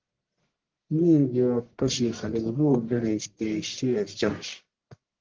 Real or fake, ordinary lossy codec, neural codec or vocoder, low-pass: fake; Opus, 16 kbps; codec, 44.1 kHz, 1.7 kbps, Pupu-Codec; 7.2 kHz